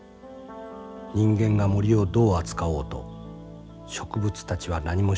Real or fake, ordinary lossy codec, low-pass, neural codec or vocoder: real; none; none; none